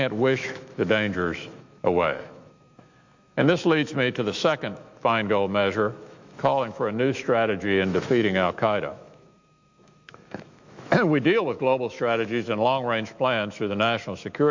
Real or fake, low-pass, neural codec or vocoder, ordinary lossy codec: fake; 7.2 kHz; autoencoder, 48 kHz, 128 numbers a frame, DAC-VAE, trained on Japanese speech; MP3, 48 kbps